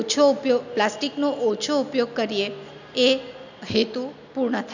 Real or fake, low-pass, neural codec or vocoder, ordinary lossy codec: real; 7.2 kHz; none; none